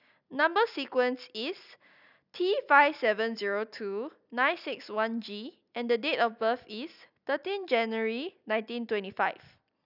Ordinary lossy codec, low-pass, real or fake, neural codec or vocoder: none; 5.4 kHz; real; none